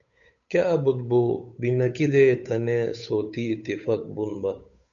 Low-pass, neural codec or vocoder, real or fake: 7.2 kHz; codec, 16 kHz, 8 kbps, FunCodec, trained on Chinese and English, 25 frames a second; fake